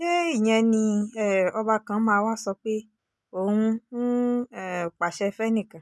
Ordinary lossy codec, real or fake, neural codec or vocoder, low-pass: none; real; none; none